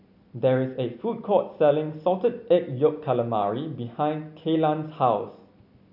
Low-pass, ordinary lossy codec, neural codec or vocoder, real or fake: 5.4 kHz; none; none; real